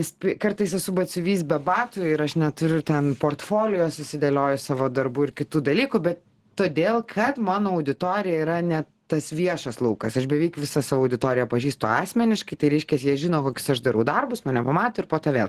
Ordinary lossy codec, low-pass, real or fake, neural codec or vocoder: Opus, 16 kbps; 14.4 kHz; real; none